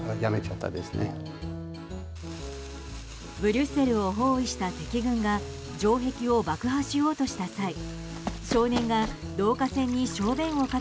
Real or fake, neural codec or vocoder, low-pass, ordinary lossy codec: real; none; none; none